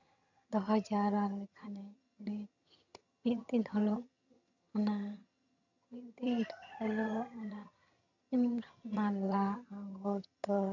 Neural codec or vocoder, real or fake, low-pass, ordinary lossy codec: vocoder, 22.05 kHz, 80 mel bands, HiFi-GAN; fake; 7.2 kHz; none